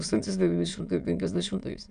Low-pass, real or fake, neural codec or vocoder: 9.9 kHz; fake; autoencoder, 22.05 kHz, a latent of 192 numbers a frame, VITS, trained on many speakers